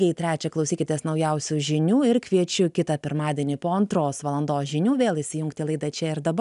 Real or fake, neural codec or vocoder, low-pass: real; none; 10.8 kHz